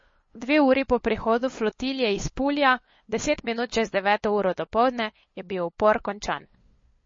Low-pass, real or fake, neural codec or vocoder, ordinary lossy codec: 7.2 kHz; fake; codec, 16 kHz, 8 kbps, FunCodec, trained on LibriTTS, 25 frames a second; MP3, 32 kbps